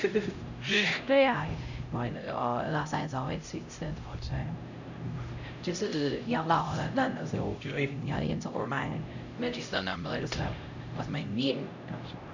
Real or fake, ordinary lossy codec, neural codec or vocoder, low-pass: fake; none; codec, 16 kHz, 0.5 kbps, X-Codec, HuBERT features, trained on LibriSpeech; 7.2 kHz